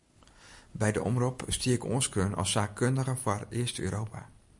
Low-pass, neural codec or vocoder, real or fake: 10.8 kHz; none; real